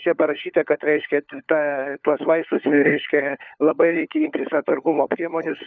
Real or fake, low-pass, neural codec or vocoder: fake; 7.2 kHz; codec, 16 kHz, 4 kbps, FunCodec, trained on LibriTTS, 50 frames a second